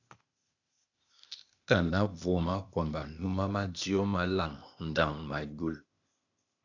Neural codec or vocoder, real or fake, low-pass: codec, 16 kHz, 0.8 kbps, ZipCodec; fake; 7.2 kHz